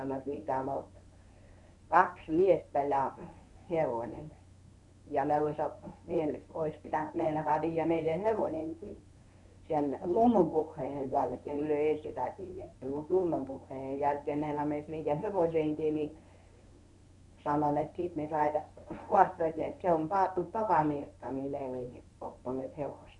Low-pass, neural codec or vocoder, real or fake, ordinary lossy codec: 10.8 kHz; codec, 24 kHz, 0.9 kbps, WavTokenizer, medium speech release version 1; fake; none